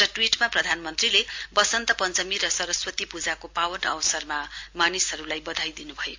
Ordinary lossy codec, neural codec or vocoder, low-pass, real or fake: MP3, 48 kbps; none; 7.2 kHz; real